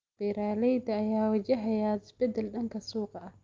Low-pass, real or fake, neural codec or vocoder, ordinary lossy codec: 7.2 kHz; real; none; Opus, 24 kbps